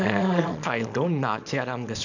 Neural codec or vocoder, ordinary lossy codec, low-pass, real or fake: codec, 24 kHz, 0.9 kbps, WavTokenizer, small release; none; 7.2 kHz; fake